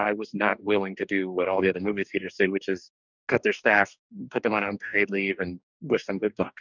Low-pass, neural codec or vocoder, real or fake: 7.2 kHz; codec, 44.1 kHz, 2.6 kbps, DAC; fake